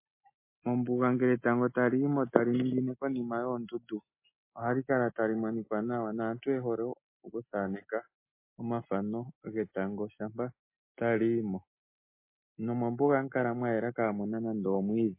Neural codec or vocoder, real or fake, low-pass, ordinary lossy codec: none; real; 3.6 kHz; MP3, 24 kbps